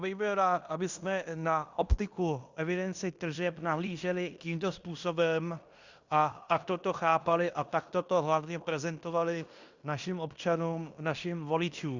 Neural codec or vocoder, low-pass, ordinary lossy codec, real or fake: codec, 16 kHz in and 24 kHz out, 0.9 kbps, LongCat-Audio-Codec, fine tuned four codebook decoder; 7.2 kHz; Opus, 64 kbps; fake